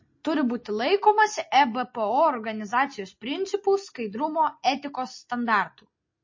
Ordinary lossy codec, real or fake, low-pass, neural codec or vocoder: MP3, 32 kbps; real; 7.2 kHz; none